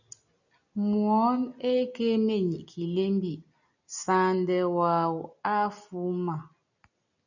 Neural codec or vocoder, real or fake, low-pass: none; real; 7.2 kHz